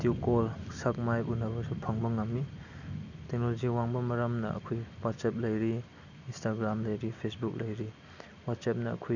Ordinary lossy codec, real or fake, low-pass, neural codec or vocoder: none; real; 7.2 kHz; none